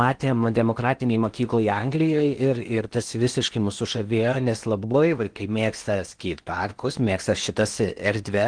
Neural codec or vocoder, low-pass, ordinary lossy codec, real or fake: codec, 16 kHz in and 24 kHz out, 0.8 kbps, FocalCodec, streaming, 65536 codes; 9.9 kHz; Opus, 24 kbps; fake